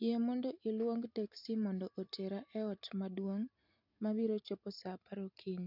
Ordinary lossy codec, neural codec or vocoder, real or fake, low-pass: none; none; real; 5.4 kHz